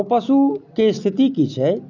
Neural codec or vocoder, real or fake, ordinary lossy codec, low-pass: none; real; none; 7.2 kHz